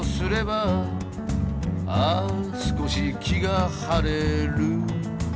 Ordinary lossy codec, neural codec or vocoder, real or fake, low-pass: none; none; real; none